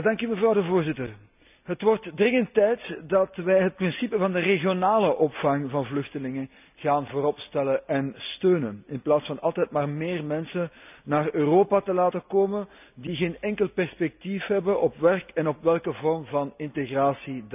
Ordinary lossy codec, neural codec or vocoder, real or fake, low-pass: none; none; real; 3.6 kHz